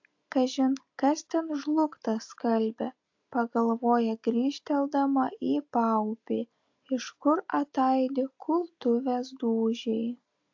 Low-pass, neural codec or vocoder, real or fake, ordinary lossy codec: 7.2 kHz; none; real; AAC, 48 kbps